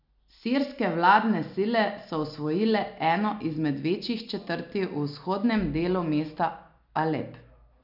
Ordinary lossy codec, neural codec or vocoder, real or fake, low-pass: none; none; real; 5.4 kHz